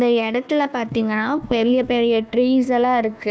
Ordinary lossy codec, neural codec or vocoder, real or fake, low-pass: none; codec, 16 kHz, 1 kbps, FunCodec, trained on Chinese and English, 50 frames a second; fake; none